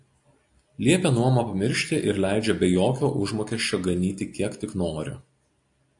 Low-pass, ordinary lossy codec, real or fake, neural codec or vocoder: 10.8 kHz; AAC, 64 kbps; real; none